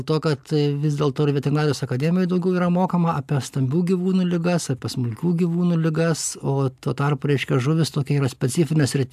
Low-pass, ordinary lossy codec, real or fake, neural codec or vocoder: 14.4 kHz; AAC, 96 kbps; real; none